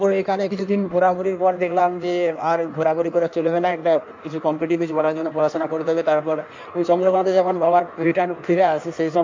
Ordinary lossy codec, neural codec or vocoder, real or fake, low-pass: MP3, 64 kbps; codec, 16 kHz in and 24 kHz out, 1.1 kbps, FireRedTTS-2 codec; fake; 7.2 kHz